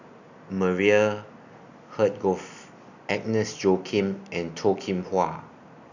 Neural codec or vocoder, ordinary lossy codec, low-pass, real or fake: none; none; 7.2 kHz; real